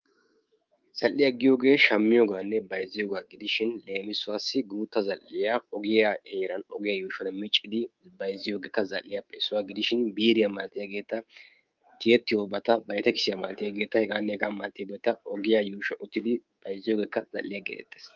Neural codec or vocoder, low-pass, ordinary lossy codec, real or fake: codec, 24 kHz, 3.1 kbps, DualCodec; 7.2 kHz; Opus, 24 kbps; fake